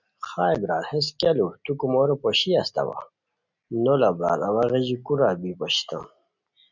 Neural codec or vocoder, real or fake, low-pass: none; real; 7.2 kHz